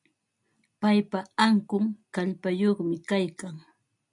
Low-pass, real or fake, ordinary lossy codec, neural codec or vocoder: 10.8 kHz; real; MP3, 64 kbps; none